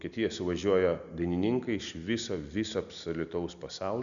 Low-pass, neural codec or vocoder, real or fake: 7.2 kHz; none; real